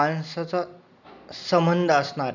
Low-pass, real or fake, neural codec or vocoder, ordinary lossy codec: 7.2 kHz; real; none; none